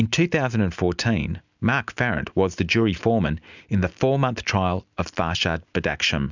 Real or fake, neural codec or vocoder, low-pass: real; none; 7.2 kHz